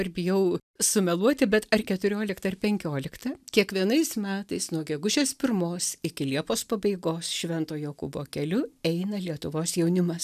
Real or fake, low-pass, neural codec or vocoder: real; 14.4 kHz; none